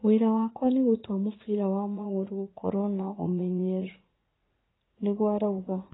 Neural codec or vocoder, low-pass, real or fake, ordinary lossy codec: vocoder, 44.1 kHz, 80 mel bands, Vocos; 7.2 kHz; fake; AAC, 16 kbps